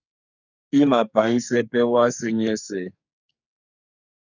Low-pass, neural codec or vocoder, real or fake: 7.2 kHz; codec, 44.1 kHz, 2.6 kbps, SNAC; fake